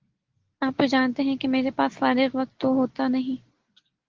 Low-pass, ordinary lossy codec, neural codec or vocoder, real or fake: 7.2 kHz; Opus, 16 kbps; none; real